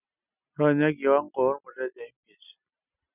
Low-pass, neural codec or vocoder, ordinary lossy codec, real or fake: 3.6 kHz; none; none; real